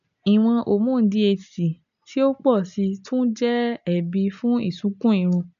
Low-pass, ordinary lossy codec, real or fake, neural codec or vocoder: 7.2 kHz; none; real; none